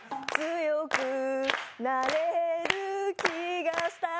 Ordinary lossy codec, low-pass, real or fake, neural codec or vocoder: none; none; real; none